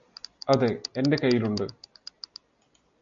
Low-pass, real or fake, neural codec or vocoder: 7.2 kHz; real; none